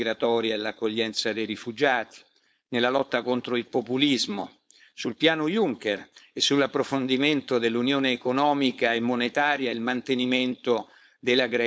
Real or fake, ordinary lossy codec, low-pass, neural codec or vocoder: fake; none; none; codec, 16 kHz, 4.8 kbps, FACodec